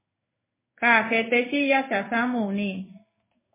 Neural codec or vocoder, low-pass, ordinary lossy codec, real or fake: codec, 16 kHz in and 24 kHz out, 1 kbps, XY-Tokenizer; 3.6 kHz; MP3, 16 kbps; fake